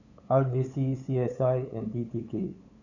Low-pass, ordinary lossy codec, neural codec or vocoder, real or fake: 7.2 kHz; none; codec, 16 kHz, 8 kbps, FunCodec, trained on LibriTTS, 25 frames a second; fake